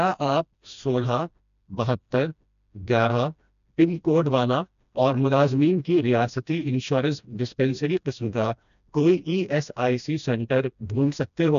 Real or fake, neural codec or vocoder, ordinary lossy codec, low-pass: fake; codec, 16 kHz, 1 kbps, FreqCodec, smaller model; none; 7.2 kHz